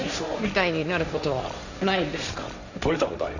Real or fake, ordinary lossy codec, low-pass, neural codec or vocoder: fake; none; 7.2 kHz; codec, 16 kHz, 1.1 kbps, Voila-Tokenizer